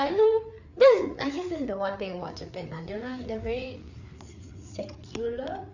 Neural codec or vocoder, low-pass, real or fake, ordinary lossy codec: codec, 16 kHz, 4 kbps, FreqCodec, larger model; 7.2 kHz; fake; none